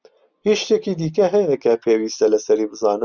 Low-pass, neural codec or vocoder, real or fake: 7.2 kHz; none; real